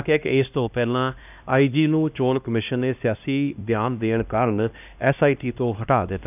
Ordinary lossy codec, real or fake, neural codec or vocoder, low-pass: none; fake; codec, 16 kHz, 1 kbps, X-Codec, HuBERT features, trained on LibriSpeech; 3.6 kHz